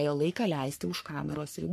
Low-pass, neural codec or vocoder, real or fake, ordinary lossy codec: 14.4 kHz; codec, 44.1 kHz, 3.4 kbps, Pupu-Codec; fake; MP3, 64 kbps